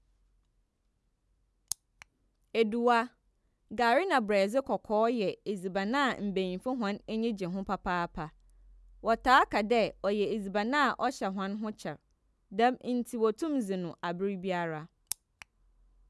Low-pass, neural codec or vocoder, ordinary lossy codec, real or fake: none; none; none; real